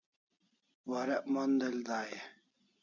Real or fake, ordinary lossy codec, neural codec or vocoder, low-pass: real; MP3, 48 kbps; none; 7.2 kHz